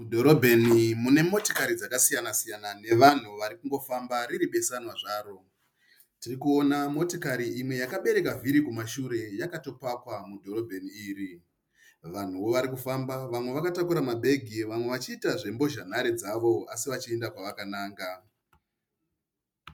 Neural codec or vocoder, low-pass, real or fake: none; 19.8 kHz; real